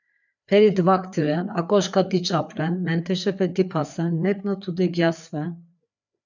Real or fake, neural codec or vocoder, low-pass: fake; codec, 16 kHz, 4 kbps, FreqCodec, larger model; 7.2 kHz